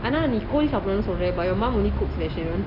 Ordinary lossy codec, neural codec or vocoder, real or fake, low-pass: none; none; real; 5.4 kHz